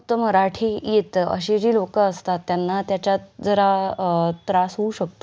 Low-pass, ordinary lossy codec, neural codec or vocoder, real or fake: none; none; none; real